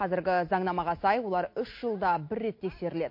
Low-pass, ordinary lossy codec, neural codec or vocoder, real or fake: 5.4 kHz; MP3, 32 kbps; none; real